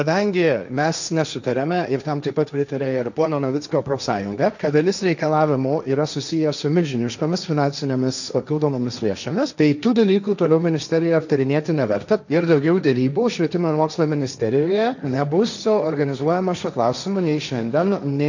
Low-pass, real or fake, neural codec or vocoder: 7.2 kHz; fake; codec, 16 kHz, 1.1 kbps, Voila-Tokenizer